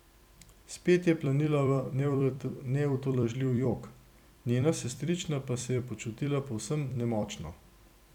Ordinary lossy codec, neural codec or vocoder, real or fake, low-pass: none; vocoder, 44.1 kHz, 128 mel bands every 256 samples, BigVGAN v2; fake; 19.8 kHz